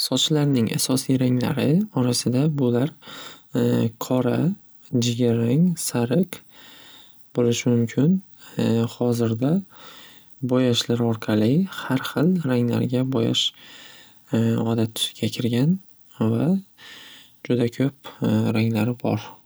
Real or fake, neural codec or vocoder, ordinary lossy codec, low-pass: real; none; none; none